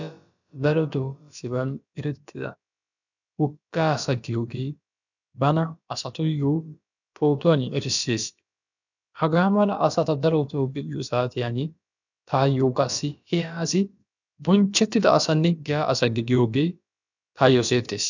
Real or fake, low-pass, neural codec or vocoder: fake; 7.2 kHz; codec, 16 kHz, about 1 kbps, DyCAST, with the encoder's durations